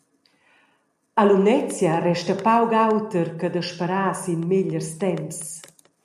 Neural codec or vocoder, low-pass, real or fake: none; 14.4 kHz; real